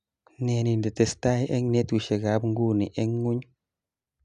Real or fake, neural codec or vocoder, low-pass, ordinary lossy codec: real; none; 10.8 kHz; none